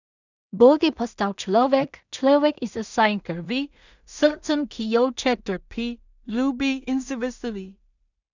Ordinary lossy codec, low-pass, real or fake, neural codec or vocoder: none; 7.2 kHz; fake; codec, 16 kHz in and 24 kHz out, 0.4 kbps, LongCat-Audio-Codec, two codebook decoder